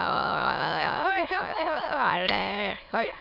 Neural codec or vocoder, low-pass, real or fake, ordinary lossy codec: autoencoder, 22.05 kHz, a latent of 192 numbers a frame, VITS, trained on many speakers; 5.4 kHz; fake; none